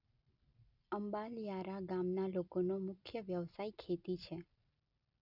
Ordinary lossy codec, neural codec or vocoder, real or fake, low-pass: none; none; real; 5.4 kHz